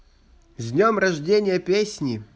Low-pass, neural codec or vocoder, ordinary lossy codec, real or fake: none; none; none; real